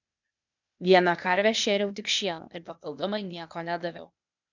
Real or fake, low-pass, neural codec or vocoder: fake; 7.2 kHz; codec, 16 kHz, 0.8 kbps, ZipCodec